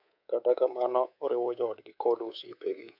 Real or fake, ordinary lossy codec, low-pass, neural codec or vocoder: fake; none; 5.4 kHz; codec, 24 kHz, 3.1 kbps, DualCodec